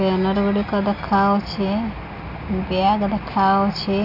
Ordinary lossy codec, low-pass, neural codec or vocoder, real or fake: none; 5.4 kHz; none; real